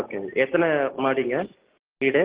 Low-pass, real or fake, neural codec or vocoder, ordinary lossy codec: 3.6 kHz; real; none; Opus, 16 kbps